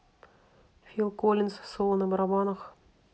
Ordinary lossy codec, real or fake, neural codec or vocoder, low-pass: none; real; none; none